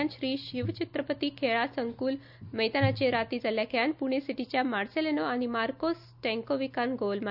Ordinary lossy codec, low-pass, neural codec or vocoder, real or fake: none; 5.4 kHz; none; real